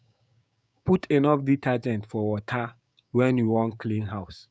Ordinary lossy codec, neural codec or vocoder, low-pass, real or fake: none; codec, 16 kHz, 6 kbps, DAC; none; fake